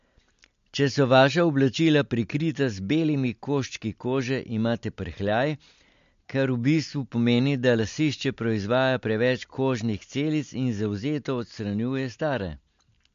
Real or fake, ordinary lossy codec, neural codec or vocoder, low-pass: real; MP3, 48 kbps; none; 7.2 kHz